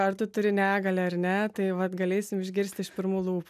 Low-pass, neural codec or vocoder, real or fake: 14.4 kHz; none; real